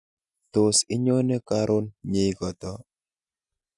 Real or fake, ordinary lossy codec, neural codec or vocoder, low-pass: real; none; none; 10.8 kHz